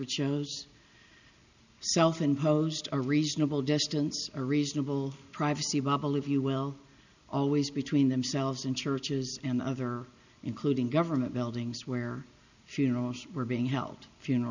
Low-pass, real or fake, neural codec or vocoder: 7.2 kHz; real; none